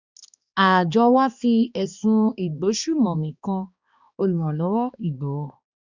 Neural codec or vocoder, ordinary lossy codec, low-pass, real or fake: codec, 16 kHz, 2 kbps, X-Codec, HuBERT features, trained on balanced general audio; Opus, 64 kbps; 7.2 kHz; fake